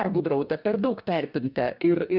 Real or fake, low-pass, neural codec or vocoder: fake; 5.4 kHz; codec, 44.1 kHz, 2.6 kbps, DAC